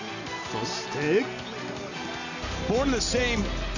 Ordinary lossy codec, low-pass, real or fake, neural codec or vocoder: none; 7.2 kHz; real; none